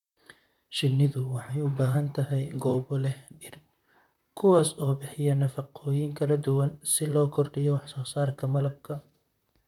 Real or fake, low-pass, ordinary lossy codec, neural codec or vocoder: fake; 19.8 kHz; none; vocoder, 44.1 kHz, 128 mel bands, Pupu-Vocoder